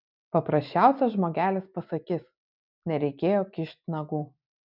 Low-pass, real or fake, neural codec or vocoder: 5.4 kHz; real; none